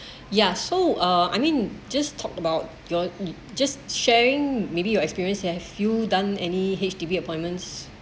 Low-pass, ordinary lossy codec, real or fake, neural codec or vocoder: none; none; real; none